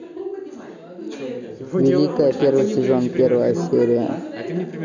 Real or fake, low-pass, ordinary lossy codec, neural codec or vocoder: real; 7.2 kHz; none; none